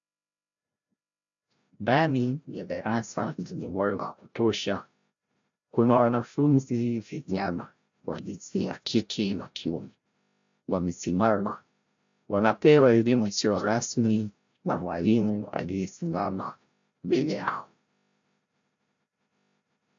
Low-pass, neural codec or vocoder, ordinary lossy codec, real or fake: 7.2 kHz; codec, 16 kHz, 0.5 kbps, FreqCodec, larger model; AAC, 64 kbps; fake